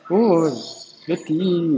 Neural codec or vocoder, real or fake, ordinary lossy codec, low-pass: none; real; none; none